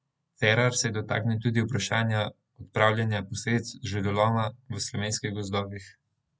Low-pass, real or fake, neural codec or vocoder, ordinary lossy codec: none; real; none; none